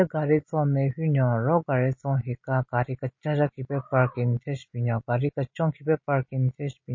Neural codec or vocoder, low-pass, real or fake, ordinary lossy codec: none; 7.2 kHz; real; MP3, 32 kbps